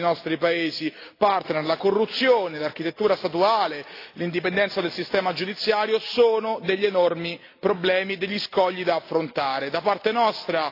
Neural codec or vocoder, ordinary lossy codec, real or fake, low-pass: none; AAC, 32 kbps; real; 5.4 kHz